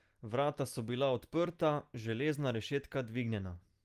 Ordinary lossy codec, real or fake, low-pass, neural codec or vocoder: Opus, 24 kbps; real; 14.4 kHz; none